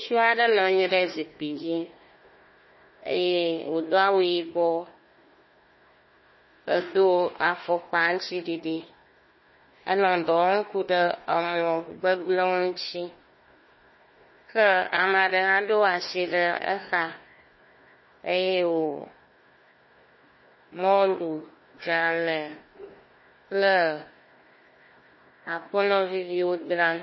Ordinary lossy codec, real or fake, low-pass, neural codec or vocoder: MP3, 24 kbps; fake; 7.2 kHz; codec, 16 kHz, 1 kbps, FunCodec, trained on Chinese and English, 50 frames a second